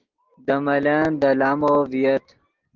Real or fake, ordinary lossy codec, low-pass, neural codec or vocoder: real; Opus, 16 kbps; 7.2 kHz; none